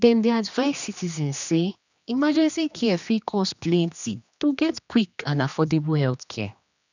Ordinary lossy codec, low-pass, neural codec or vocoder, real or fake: none; 7.2 kHz; codec, 16 kHz, 2 kbps, X-Codec, HuBERT features, trained on general audio; fake